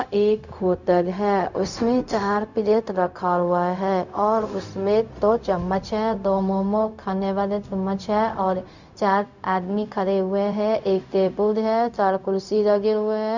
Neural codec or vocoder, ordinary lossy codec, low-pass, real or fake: codec, 16 kHz, 0.4 kbps, LongCat-Audio-Codec; none; 7.2 kHz; fake